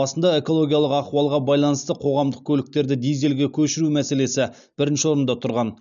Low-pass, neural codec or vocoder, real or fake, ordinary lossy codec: 7.2 kHz; none; real; none